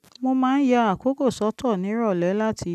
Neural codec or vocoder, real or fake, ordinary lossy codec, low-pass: none; real; none; 14.4 kHz